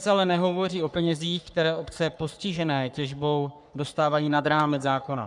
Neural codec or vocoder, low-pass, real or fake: codec, 44.1 kHz, 3.4 kbps, Pupu-Codec; 10.8 kHz; fake